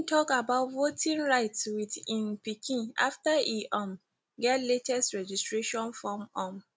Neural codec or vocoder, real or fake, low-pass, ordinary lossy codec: none; real; none; none